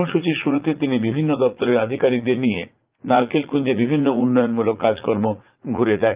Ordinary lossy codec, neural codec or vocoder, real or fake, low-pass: Opus, 32 kbps; vocoder, 44.1 kHz, 80 mel bands, Vocos; fake; 3.6 kHz